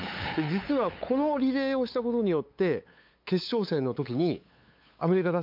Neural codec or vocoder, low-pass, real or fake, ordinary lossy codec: codec, 16 kHz, 8 kbps, FunCodec, trained on LibriTTS, 25 frames a second; 5.4 kHz; fake; MP3, 48 kbps